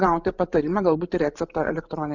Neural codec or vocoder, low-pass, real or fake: vocoder, 44.1 kHz, 128 mel bands every 512 samples, BigVGAN v2; 7.2 kHz; fake